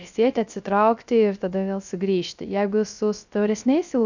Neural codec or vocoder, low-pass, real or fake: codec, 16 kHz, 0.3 kbps, FocalCodec; 7.2 kHz; fake